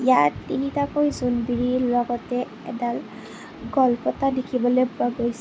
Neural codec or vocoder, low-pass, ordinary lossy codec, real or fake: none; none; none; real